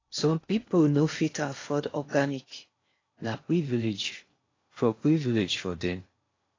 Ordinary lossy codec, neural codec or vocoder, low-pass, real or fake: AAC, 32 kbps; codec, 16 kHz in and 24 kHz out, 0.6 kbps, FocalCodec, streaming, 2048 codes; 7.2 kHz; fake